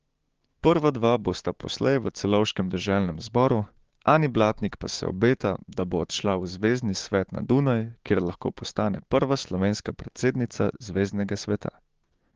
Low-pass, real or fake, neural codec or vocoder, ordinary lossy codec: 7.2 kHz; fake; codec, 16 kHz, 6 kbps, DAC; Opus, 16 kbps